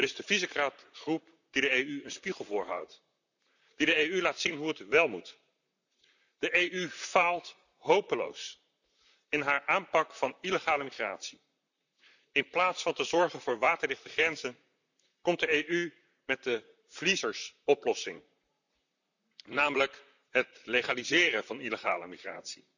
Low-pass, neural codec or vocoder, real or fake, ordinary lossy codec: 7.2 kHz; vocoder, 44.1 kHz, 128 mel bands, Pupu-Vocoder; fake; none